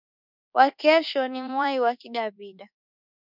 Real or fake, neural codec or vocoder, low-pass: fake; codec, 24 kHz, 1.2 kbps, DualCodec; 5.4 kHz